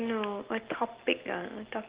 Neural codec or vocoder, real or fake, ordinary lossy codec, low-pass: none; real; Opus, 16 kbps; 3.6 kHz